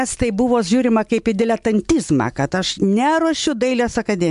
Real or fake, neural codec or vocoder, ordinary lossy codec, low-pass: real; none; MP3, 64 kbps; 10.8 kHz